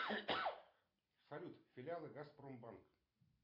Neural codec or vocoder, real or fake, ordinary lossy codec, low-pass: none; real; MP3, 32 kbps; 5.4 kHz